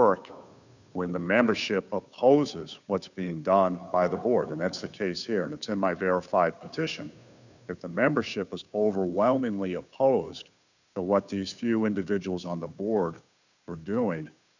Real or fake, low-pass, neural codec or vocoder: fake; 7.2 kHz; codec, 16 kHz, 2 kbps, FunCodec, trained on Chinese and English, 25 frames a second